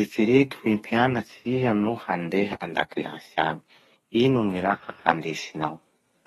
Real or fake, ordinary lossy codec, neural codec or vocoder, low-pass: fake; AAC, 32 kbps; codec, 32 kHz, 1.9 kbps, SNAC; 14.4 kHz